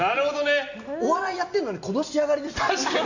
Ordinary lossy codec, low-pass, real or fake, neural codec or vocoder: AAC, 48 kbps; 7.2 kHz; real; none